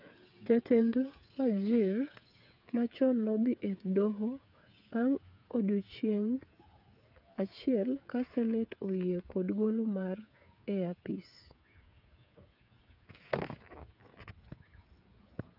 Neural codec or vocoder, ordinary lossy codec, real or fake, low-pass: codec, 16 kHz, 8 kbps, FreqCodec, smaller model; none; fake; 5.4 kHz